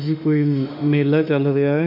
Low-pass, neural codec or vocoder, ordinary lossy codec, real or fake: 5.4 kHz; autoencoder, 48 kHz, 32 numbers a frame, DAC-VAE, trained on Japanese speech; none; fake